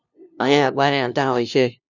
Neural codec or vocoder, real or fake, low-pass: codec, 16 kHz, 0.5 kbps, FunCodec, trained on LibriTTS, 25 frames a second; fake; 7.2 kHz